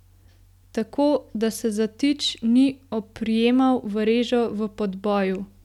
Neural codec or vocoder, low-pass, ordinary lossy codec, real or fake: none; 19.8 kHz; none; real